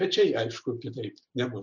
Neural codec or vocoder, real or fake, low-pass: none; real; 7.2 kHz